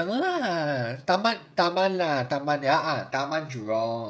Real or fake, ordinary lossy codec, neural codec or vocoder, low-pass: fake; none; codec, 16 kHz, 16 kbps, FreqCodec, smaller model; none